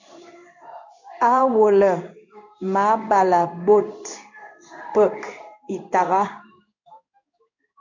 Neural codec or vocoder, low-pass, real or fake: codec, 16 kHz in and 24 kHz out, 1 kbps, XY-Tokenizer; 7.2 kHz; fake